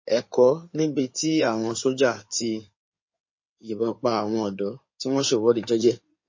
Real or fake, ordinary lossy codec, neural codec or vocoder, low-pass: fake; MP3, 32 kbps; codec, 16 kHz in and 24 kHz out, 2.2 kbps, FireRedTTS-2 codec; 7.2 kHz